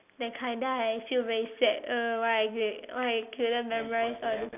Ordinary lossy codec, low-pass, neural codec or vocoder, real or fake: none; 3.6 kHz; none; real